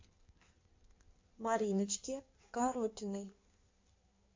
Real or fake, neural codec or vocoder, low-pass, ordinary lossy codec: fake; codec, 16 kHz in and 24 kHz out, 1.1 kbps, FireRedTTS-2 codec; 7.2 kHz; MP3, 48 kbps